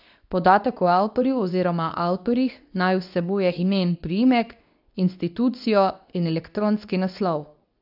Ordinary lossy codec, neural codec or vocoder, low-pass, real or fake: AAC, 48 kbps; codec, 24 kHz, 0.9 kbps, WavTokenizer, medium speech release version 2; 5.4 kHz; fake